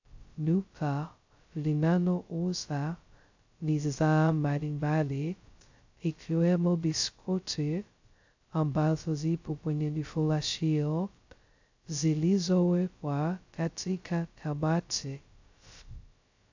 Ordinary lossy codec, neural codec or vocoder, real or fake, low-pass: AAC, 48 kbps; codec, 16 kHz, 0.2 kbps, FocalCodec; fake; 7.2 kHz